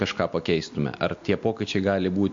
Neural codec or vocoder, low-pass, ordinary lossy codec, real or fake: none; 7.2 kHz; MP3, 48 kbps; real